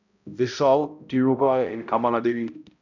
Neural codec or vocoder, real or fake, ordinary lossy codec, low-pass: codec, 16 kHz, 0.5 kbps, X-Codec, HuBERT features, trained on balanced general audio; fake; none; 7.2 kHz